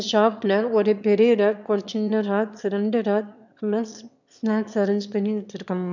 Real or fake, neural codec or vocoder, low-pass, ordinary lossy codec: fake; autoencoder, 22.05 kHz, a latent of 192 numbers a frame, VITS, trained on one speaker; 7.2 kHz; none